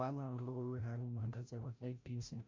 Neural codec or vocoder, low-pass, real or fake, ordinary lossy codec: codec, 16 kHz, 0.5 kbps, FreqCodec, larger model; 7.2 kHz; fake; MP3, 48 kbps